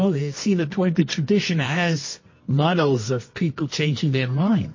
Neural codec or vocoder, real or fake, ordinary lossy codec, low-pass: codec, 24 kHz, 0.9 kbps, WavTokenizer, medium music audio release; fake; MP3, 32 kbps; 7.2 kHz